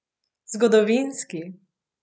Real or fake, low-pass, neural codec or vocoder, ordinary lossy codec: real; none; none; none